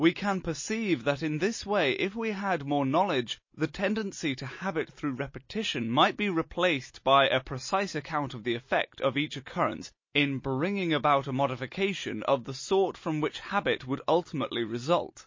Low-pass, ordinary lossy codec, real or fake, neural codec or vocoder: 7.2 kHz; MP3, 32 kbps; real; none